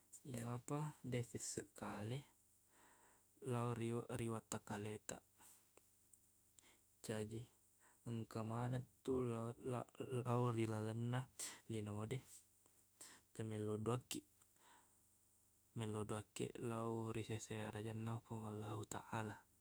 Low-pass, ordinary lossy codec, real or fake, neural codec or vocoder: none; none; fake; autoencoder, 48 kHz, 32 numbers a frame, DAC-VAE, trained on Japanese speech